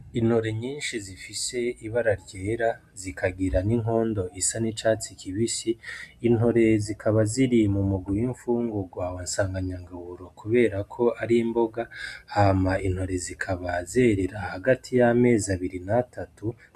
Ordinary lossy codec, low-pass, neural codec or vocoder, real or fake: MP3, 96 kbps; 14.4 kHz; none; real